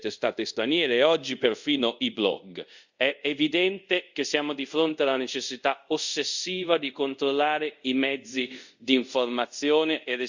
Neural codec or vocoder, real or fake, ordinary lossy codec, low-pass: codec, 24 kHz, 0.5 kbps, DualCodec; fake; Opus, 64 kbps; 7.2 kHz